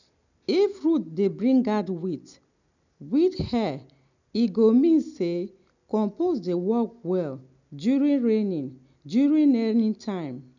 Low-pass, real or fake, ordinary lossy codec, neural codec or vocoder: 7.2 kHz; real; none; none